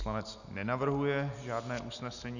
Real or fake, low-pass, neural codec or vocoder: real; 7.2 kHz; none